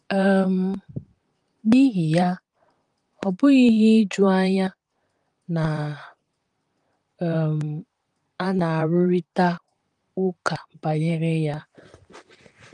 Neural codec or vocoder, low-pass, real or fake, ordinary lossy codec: vocoder, 44.1 kHz, 128 mel bands, Pupu-Vocoder; 10.8 kHz; fake; Opus, 32 kbps